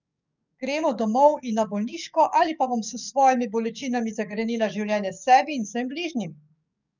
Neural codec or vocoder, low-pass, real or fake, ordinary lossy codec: codec, 44.1 kHz, 7.8 kbps, DAC; 7.2 kHz; fake; none